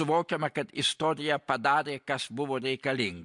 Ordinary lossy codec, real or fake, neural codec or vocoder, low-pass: MP3, 64 kbps; real; none; 10.8 kHz